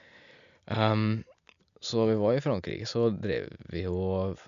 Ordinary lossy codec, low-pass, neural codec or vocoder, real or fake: none; 7.2 kHz; none; real